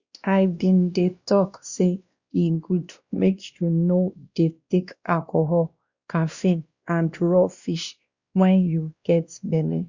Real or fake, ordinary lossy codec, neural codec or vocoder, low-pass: fake; Opus, 64 kbps; codec, 16 kHz, 1 kbps, X-Codec, WavLM features, trained on Multilingual LibriSpeech; 7.2 kHz